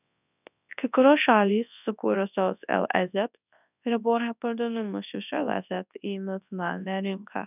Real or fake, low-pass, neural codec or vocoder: fake; 3.6 kHz; codec, 24 kHz, 0.9 kbps, WavTokenizer, large speech release